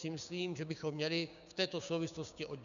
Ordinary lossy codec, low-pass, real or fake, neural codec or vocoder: MP3, 96 kbps; 7.2 kHz; fake; codec, 16 kHz, 6 kbps, DAC